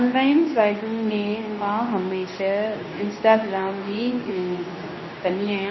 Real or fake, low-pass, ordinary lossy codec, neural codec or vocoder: fake; 7.2 kHz; MP3, 24 kbps; codec, 24 kHz, 0.9 kbps, WavTokenizer, medium speech release version 2